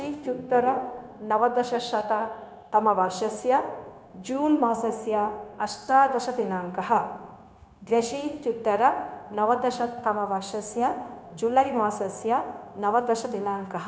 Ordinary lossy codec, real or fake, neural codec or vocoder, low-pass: none; fake; codec, 16 kHz, 0.9 kbps, LongCat-Audio-Codec; none